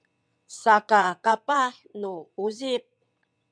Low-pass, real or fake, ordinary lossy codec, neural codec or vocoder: 9.9 kHz; fake; MP3, 96 kbps; vocoder, 22.05 kHz, 80 mel bands, WaveNeXt